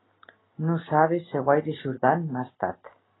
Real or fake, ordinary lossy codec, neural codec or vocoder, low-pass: real; AAC, 16 kbps; none; 7.2 kHz